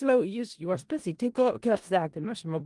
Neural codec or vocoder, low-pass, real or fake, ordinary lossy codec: codec, 16 kHz in and 24 kHz out, 0.4 kbps, LongCat-Audio-Codec, four codebook decoder; 10.8 kHz; fake; Opus, 24 kbps